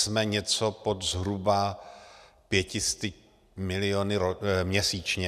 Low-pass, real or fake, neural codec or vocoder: 14.4 kHz; real; none